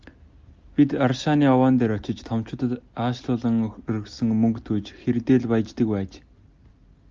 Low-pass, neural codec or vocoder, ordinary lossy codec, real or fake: 7.2 kHz; none; Opus, 24 kbps; real